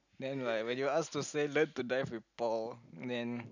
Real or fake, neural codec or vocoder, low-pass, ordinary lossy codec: real; none; 7.2 kHz; none